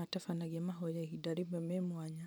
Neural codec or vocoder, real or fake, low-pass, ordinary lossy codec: vocoder, 44.1 kHz, 128 mel bands every 512 samples, BigVGAN v2; fake; none; none